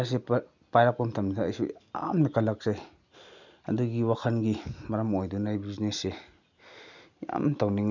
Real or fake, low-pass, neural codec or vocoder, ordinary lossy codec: real; 7.2 kHz; none; none